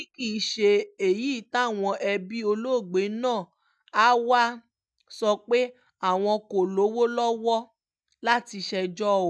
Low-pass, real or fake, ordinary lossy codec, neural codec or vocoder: none; real; none; none